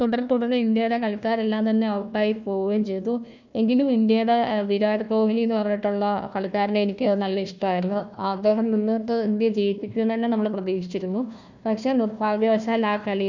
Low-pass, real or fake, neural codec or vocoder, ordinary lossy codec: 7.2 kHz; fake; codec, 16 kHz, 1 kbps, FunCodec, trained on Chinese and English, 50 frames a second; none